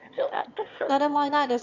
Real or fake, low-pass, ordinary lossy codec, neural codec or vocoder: fake; 7.2 kHz; none; autoencoder, 22.05 kHz, a latent of 192 numbers a frame, VITS, trained on one speaker